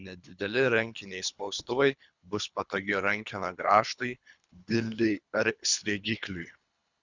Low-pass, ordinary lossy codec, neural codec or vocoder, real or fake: 7.2 kHz; Opus, 64 kbps; codec, 24 kHz, 3 kbps, HILCodec; fake